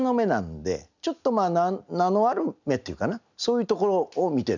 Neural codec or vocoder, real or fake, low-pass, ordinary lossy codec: none; real; 7.2 kHz; none